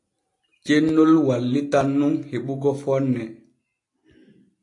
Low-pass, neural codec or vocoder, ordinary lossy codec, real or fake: 10.8 kHz; none; AAC, 48 kbps; real